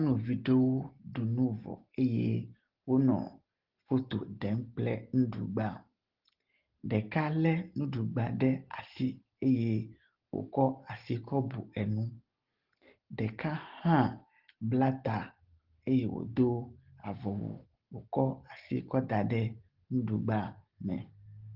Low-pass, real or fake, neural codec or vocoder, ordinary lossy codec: 5.4 kHz; real; none; Opus, 16 kbps